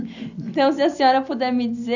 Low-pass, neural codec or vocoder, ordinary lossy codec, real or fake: 7.2 kHz; none; none; real